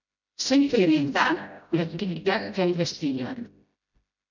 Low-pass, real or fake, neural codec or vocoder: 7.2 kHz; fake; codec, 16 kHz, 0.5 kbps, FreqCodec, smaller model